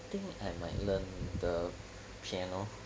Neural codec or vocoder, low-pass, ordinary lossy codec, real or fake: none; none; none; real